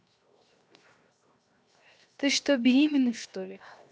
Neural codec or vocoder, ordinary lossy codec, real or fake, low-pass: codec, 16 kHz, 0.7 kbps, FocalCodec; none; fake; none